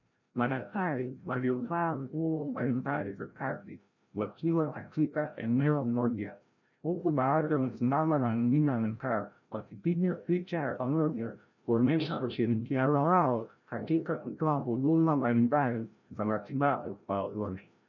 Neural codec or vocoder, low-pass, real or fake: codec, 16 kHz, 0.5 kbps, FreqCodec, larger model; 7.2 kHz; fake